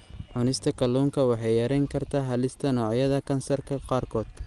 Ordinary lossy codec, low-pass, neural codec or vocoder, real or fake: Opus, 24 kbps; 10.8 kHz; none; real